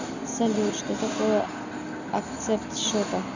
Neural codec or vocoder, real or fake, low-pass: none; real; 7.2 kHz